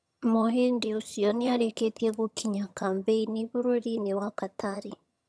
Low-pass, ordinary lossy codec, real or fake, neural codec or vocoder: none; none; fake; vocoder, 22.05 kHz, 80 mel bands, HiFi-GAN